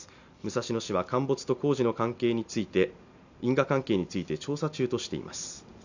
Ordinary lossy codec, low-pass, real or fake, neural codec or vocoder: none; 7.2 kHz; real; none